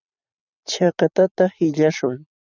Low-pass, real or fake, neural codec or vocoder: 7.2 kHz; fake; vocoder, 44.1 kHz, 128 mel bands every 256 samples, BigVGAN v2